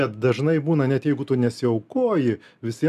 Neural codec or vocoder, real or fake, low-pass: none; real; 14.4 kHz